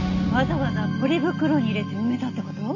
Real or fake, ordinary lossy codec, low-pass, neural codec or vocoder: real; none; 7.2 kHz; none